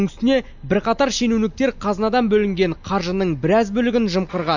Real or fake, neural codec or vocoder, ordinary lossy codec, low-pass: real; none; none; 7.2 kHz